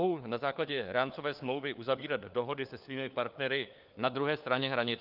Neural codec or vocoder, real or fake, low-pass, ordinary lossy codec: codec, 16 kHz, 4 kbps, FunCodec, trained on LibriTTS, 50 frames a second; fake; 5.4 kHz; Opus, 24 kbps